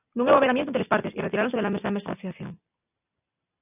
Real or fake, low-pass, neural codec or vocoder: real; 3.6 kHz; none